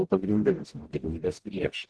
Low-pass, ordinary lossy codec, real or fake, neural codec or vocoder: 10.8 kHz; Opus, 16 kbps; fake; codec, 44.1 kHz, 0.9 kbps, DAC